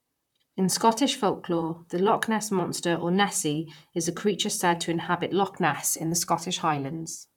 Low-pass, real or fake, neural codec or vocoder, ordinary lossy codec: 19.8 kHz; fake; vocoder, 44.1 kHz, 128 mel bands, Pupu-Vocoder; none